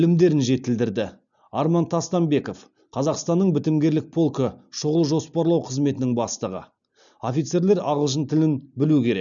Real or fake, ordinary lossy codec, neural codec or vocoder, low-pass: real; none; none; 7.2 kHz